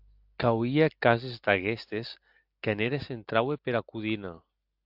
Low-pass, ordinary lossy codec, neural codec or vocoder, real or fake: 5.4 kHz; AAC, 48 kbps; none; real